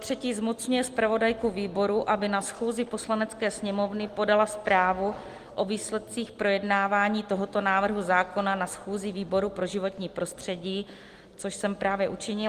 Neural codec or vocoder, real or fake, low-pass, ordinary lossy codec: none; real; 14.4 kHz; Opus, 24 kbps